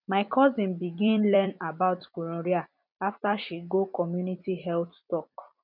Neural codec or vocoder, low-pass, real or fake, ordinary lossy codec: vocoder, 44.1 kHz, 128 mel bands every 256 samples, BigVGAN v2; 5.4 kHz; fake; none